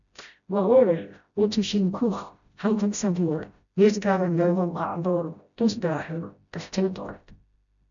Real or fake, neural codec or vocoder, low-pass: fake; codec, 16 kHz, 0.5 kbps, FreqCodec, smaller model; 7.2 kHz